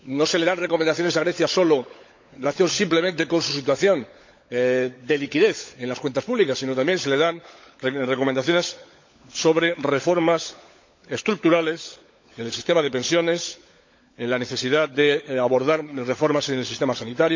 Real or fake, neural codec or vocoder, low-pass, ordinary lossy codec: fake; codec, 16 kHz, 16 kbps, FunCodec, trained on LibriTTS, 50 frames a second; 7.2 kHz; MP3, 48 kbps